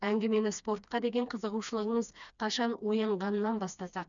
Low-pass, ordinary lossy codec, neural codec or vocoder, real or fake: 7.2 kHz; none; codec, 16 kHz, 2 kbps, FreqCodec, smaller model; fake